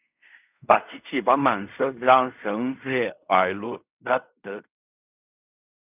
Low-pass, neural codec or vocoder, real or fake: 3.6 kHz; codec, 16 kHz in and 24 kHz out, 0.4 kbps, LongCat-Audio-Codec, fine tuned four codebook decoder; fake